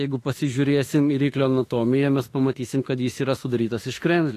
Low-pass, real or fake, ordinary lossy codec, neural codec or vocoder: 14.4 kHz; fake; AAC, 48 kbps; autoencoder, 48 kHz, 32 numbers a frame, DAC-VAE, trained on Japanese speech